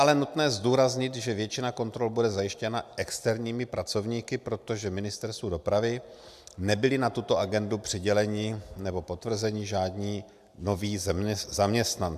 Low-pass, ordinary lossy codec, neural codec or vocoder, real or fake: 14.4 kHz; MP3, 96 kbps; none; real